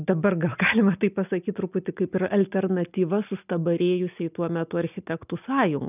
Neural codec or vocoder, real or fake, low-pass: none; real; 3.6 kHz